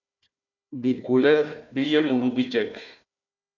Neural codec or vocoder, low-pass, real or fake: codec, 16 kHz, 1 kbps, FunCodec, trained on Chinese and English, 50 frames a second; 7.2 kHz; fake